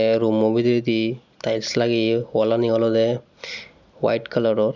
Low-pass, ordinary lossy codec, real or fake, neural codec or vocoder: 7.2 kHz; none; real; none